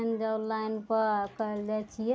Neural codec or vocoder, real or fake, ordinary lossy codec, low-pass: none; real; none; none